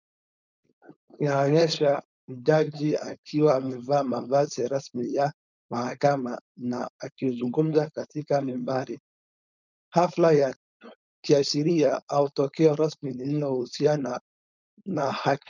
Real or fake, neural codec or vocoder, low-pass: fake; codec, 16 kHz, 4.8 kbps, FACodec; 7.2 kHz